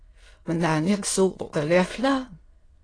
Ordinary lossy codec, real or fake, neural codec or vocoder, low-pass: AAC, 32 kbps; fake; autoencoder, 22.05 kHz, a latent of 192 numbers a frame, VITS, trained on many speakers; 9.9 kHz